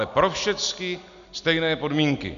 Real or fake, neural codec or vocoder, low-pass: real; none; 7.2 kHz